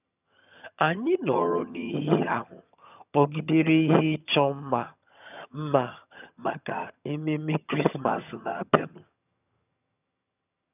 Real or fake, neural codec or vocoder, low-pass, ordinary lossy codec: fake; vocoder, 22.05 kHz, 80 mel bands, HiFi-GAN; 3.6 kHz; none